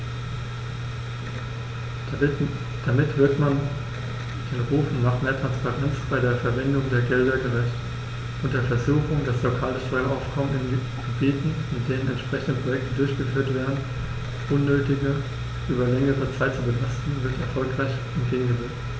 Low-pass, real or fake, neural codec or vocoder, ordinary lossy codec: none; real; none; none